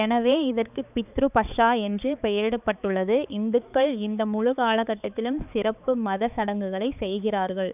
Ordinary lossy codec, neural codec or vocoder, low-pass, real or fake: none; codec, 16 kHz, 4 kbps, X-Codec, HuBERT features, trained on balanced general audio; 3.6 kHz; fake